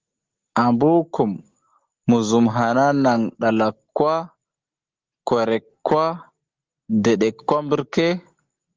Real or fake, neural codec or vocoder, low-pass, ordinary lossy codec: real; none; 7.2 kHz; Opus, 16 kbps